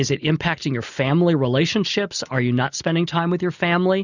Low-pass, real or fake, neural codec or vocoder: 7.2 kHz; real; none